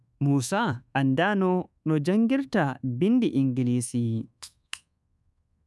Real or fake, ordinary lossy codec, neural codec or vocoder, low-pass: fake; none; codec, 24 kHz, 1.2 kbps, DualCodec; none